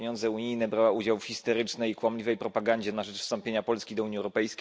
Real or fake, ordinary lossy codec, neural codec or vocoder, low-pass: real; none; none; none